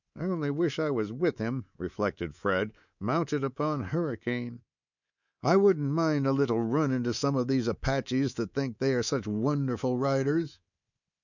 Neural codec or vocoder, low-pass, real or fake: codec, 24 kHz, 3.1 kbps, DualCodec; 7.2 kHz; fake